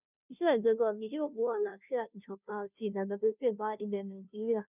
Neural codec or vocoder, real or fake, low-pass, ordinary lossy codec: codec, 16 kHz, 0.5 kbps, FunCodec, trained on Chinese and English, 25 frames a second; fake; 3.6 kHz; none